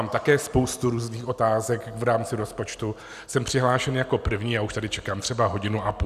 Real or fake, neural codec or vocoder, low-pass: real; none; 14.4 kHz